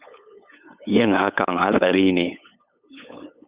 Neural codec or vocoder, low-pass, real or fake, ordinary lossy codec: codec, 16 kHz, 8 kbps, FunCodec, trained on LibriTTS, 25 frames a second; 3.6 kHz; fake; Opus, 64 kbps